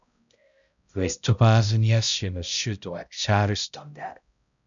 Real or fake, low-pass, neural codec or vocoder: fake; 7.2 kHz; codec, 16 kHz, 0.5 kbps, X-Codec, HuBERT features, trained on balanced general audio